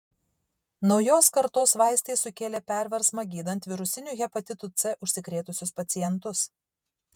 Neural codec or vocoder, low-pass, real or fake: vocoder, 48 kHz, 128 mel bands, Vocos; 19.8 kHz; fake